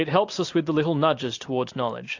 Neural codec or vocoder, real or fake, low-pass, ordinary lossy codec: none; real; 7.2 kHz; AAC, 48 kbps